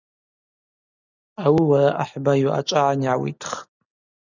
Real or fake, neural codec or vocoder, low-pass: real; none; 7.2 kHz